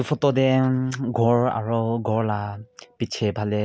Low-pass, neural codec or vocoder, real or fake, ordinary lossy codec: none; none; real; none